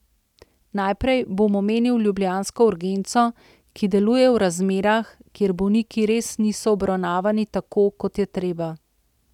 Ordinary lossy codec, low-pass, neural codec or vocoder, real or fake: none; 19.8 kHz; none; real